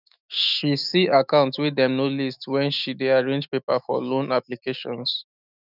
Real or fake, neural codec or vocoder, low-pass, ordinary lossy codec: fake; autoencoder, 48 kHz, 128 numbers a frame, DAC-VAE, trained on Japanese speech; 5.4 kHz; none